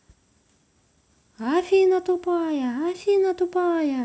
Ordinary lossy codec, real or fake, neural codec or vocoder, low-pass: none; real; none; none